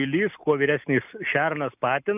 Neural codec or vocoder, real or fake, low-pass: none; real; 3.6 kHz